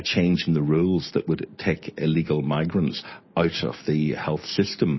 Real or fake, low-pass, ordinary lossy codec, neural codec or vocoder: real; 7.2 kHz; MP3, 24 kbps; none